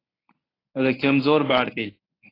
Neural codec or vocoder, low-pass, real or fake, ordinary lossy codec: codec, 24 kHz, 0.9 kbps, WavTokenizer, medium speech release version 1; 5.4 kHz; fake; AAC, 24 kbps